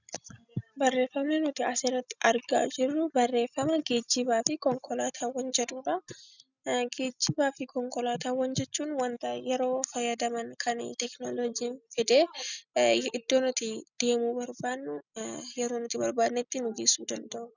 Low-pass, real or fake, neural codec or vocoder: 7.2 kHz; real; none